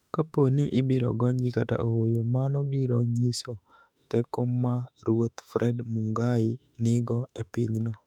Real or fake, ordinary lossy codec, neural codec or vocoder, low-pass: fake; none; autoencoder, 48 kHz, 32 numbers a frame, DAC-VAE, trained on Japanese speech; 19.8 kHz